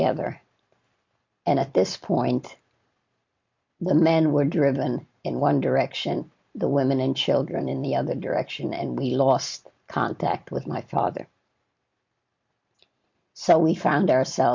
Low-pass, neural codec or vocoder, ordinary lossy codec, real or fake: 7.2 kHz; none; MP3, 48 kbps; real